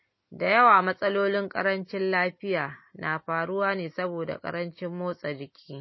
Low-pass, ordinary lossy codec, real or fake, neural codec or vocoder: 7.2 kHz; MP3, 24 kbps; real; none